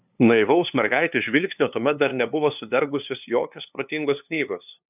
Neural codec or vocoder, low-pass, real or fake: codec, 16 kHz, 2 kbps, FunCodec, trained on LibriTTS, 25 frames a second; 3.6 kHz; fake